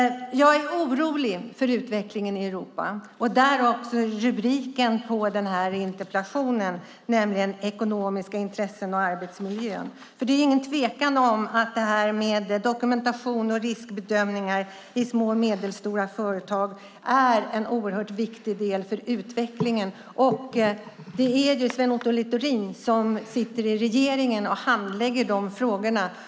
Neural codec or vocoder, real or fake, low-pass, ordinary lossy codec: none; real; none; none